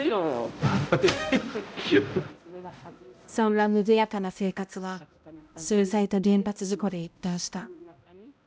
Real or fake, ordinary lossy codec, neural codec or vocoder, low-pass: fake; none; codec, 16 kHz, 0.5 kbps, X-Codec, HuBERT features, trained on balanced general audio; none